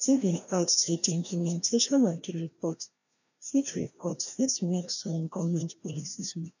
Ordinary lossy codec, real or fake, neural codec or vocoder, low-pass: none; fake; codec, 16 kHz, 1 kbps, FreqCodec, larger model; 7.2 kHz